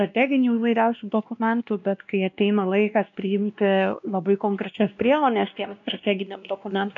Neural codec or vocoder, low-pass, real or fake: codec, 16 kHz, 1 kbps, X-Codec, WavLM features, trained on Multilingual LibriSpeech; 7.2 kHz; fake